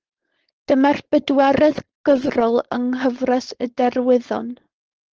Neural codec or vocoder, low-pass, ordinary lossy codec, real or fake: codec, 16 kHz, 4.8 kbps, FACodec; 7.2 kHz; Opus, 16 kbps; fake